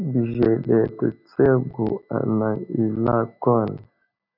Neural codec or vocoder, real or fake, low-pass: none; real; 5.4 kHz